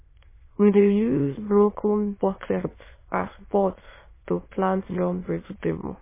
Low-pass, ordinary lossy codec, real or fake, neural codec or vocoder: 3.6 kHz; MP3, 16 kbps; fake; autoencoder, 22.05 kHz, a latent of 192 numbers a frame, VITS, trained on many speakers